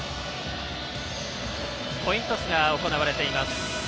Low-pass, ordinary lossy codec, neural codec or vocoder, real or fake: none; none; none; real